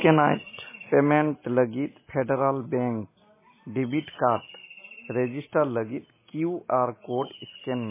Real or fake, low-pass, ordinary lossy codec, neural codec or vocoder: real; 3.6 kHz; MP3, 16 kbps; none